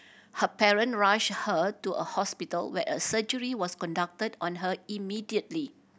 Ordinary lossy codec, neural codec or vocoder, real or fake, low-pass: none; none; real; none